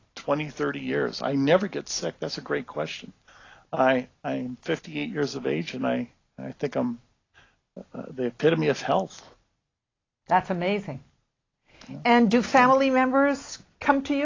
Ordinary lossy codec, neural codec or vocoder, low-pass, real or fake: AAC, 32 kbps; none; 7.2 kHz; real